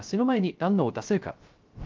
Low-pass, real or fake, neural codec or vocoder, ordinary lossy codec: 7.2 kHz; fake; codec, 16 kHz, 0.3 kbps, FocalCodec; Opus, 32 kbps